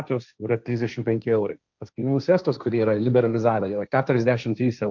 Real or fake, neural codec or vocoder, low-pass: fake; codec, 16 kHz, 1.1 kbps, Voila-Tokenizer; 7.2 kHz